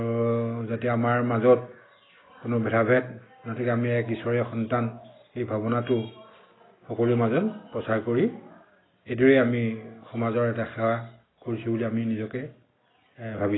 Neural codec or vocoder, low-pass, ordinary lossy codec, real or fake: none; 7.2 kHz; AAC, 16 kbps; real